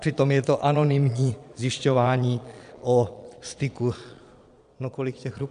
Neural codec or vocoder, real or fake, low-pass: vocoder, 22.05 kHz, 80 mel bands, Vocos; fake; 9.9 kHz